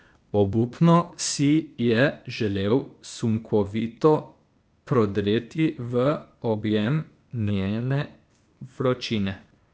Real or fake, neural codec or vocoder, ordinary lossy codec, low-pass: fake; codec, 16 kHz, 0.8 kbps, ZipCodec; none; none